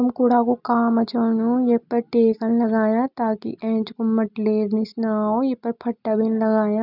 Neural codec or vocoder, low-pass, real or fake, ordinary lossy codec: none; 5.4 kHz; real; none